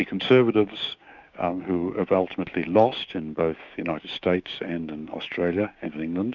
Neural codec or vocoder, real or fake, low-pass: codec, 16 kHz, 6 kbps, DAC; fake; 7.2 kHz